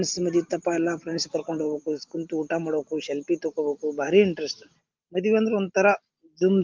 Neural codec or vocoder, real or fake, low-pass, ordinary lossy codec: none; real; 7.2 kHz; Opus, 32 kbps